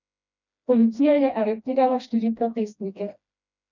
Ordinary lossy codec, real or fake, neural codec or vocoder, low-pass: none; fake; codec, 16 kHz, 1 kbps, FreqCodec, smaller model; 7.2 kHz